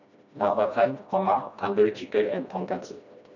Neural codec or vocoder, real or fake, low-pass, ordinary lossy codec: codec, 16 kHz, 1 kbps, FreqCodec, smaller model; fake; 7.2 kHz; none